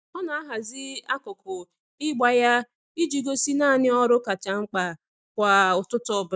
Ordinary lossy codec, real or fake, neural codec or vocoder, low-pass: none; real; none; none